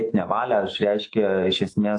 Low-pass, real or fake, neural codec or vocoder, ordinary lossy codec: 10.8 kHz; fake; autoencoder, 48 kHz, 128 numbers a frame, DAC-VAE, trained on Japanese speech; AAC, 48 kbps